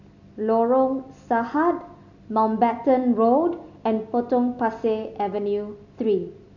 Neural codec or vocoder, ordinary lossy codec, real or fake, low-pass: none; none; real; 7.2 kHz